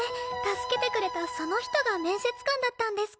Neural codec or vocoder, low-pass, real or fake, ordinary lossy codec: none; none; real; none